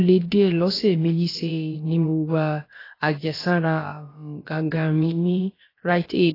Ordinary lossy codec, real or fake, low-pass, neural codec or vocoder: AAC, 24 kbps; fake; 5.4 kHz; codec, 16 kHz, about 1 kbps, DyCAST, with the encoder's durations